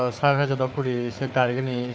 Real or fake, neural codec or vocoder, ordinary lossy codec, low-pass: fake; codec, 16 kHz, 4 kbps, FunCodec, trained on Chinese and English, 50 frames a second; none; none